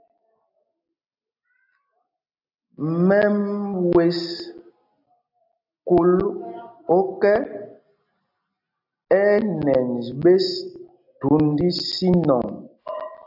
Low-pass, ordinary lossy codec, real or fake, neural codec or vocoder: 5.4 kHz; AAC, 48 kbps; real; none